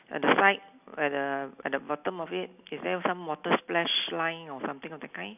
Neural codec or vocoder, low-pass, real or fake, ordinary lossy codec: none; 3.6 kHz; real; none